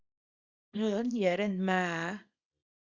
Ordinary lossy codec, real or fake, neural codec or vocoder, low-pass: Opus, 64 kbps; fake; codec, 24 kHz, 0.9 kbps, WavTokenizer, small release; 7.2 kHz